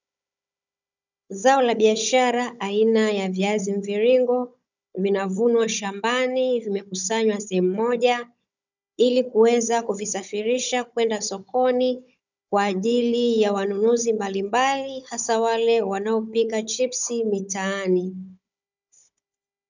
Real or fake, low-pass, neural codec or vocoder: fake; 7.2 kHz; codec, 16 kHz, 16 kbps, FunCodec, trained on Chinese and English, 50 frames a second